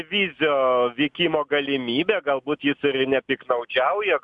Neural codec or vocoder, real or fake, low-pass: none; real; 10.8 kHz